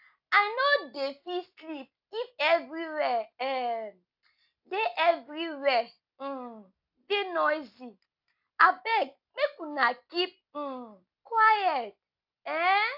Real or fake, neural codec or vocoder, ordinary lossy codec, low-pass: real; none; AAC, 48 kbps; 5.4 kHz